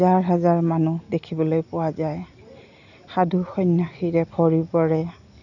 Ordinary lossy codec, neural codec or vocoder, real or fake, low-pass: none; none; real; 7.2 kHz